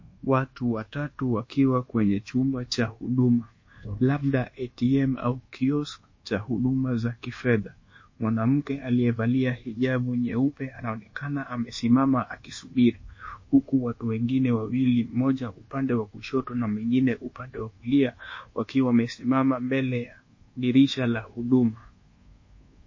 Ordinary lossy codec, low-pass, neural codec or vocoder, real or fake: MP3, 32 kbps; 7.2 kHz; codec, 24 kHz, 1.2 kbps, DualCodec; fake